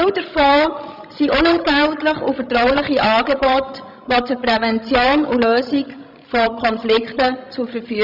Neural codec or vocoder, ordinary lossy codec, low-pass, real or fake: codec, 16 kHz, 16 kbps, FreqCodec, larger model; none; 5.4 kHz; fake